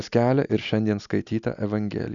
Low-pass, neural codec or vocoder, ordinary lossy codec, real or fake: 7.2 kHz; none; Opus, 64 kbps; real